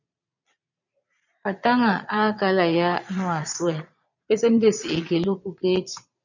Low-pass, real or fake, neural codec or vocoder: 7.2 kHz; fake; vocoder, 22.05 kHz, 80 mel bands, Vocos